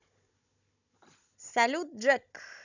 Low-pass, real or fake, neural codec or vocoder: 7.2 kHz; fake; codec, 16 kHz, 16 kbps, FunCodec, trained on Chinese and English, 50 frames a second